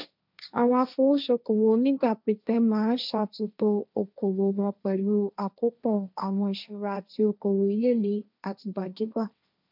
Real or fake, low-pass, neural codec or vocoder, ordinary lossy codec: fake; 5.4 kHz; codec, 16 kHz, 1.1 kbps, Voila-Tokenizer; none